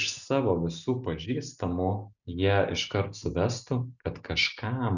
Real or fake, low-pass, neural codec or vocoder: real; 7.2 kHz; none